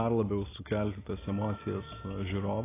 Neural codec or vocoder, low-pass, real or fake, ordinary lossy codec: none; 3.6 kHz; real; AAC, 16 kbps